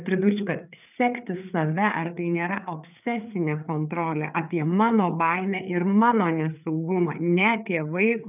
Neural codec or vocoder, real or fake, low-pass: codec, 16 kHz, 4 kbps, FreqCodec, larger model; fake; 3.6 kHz